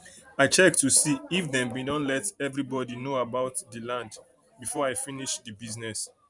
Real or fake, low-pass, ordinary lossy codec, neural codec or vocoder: fake; 10.8 kHz; none; vocoder, 44.1 kHz, 128 mel bands every 512 samples, BigVGAN v2